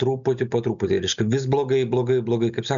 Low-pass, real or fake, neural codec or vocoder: 7.2 kHz; real; none